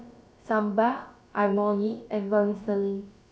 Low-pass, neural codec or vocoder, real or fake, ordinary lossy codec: none; codec, 16 kHz, about 1 kbps, DyCAST, with the encoder's durations; fake; none